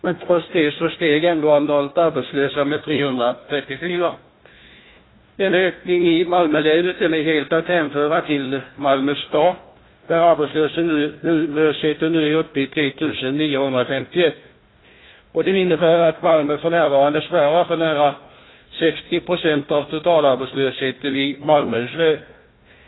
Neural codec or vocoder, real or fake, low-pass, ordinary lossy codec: codec, 16 kHz, 1 kbps, FunCodec, trained on Chinese and English, 50 frames a second; fake; 7.2 kHz; AAC, 16 kbps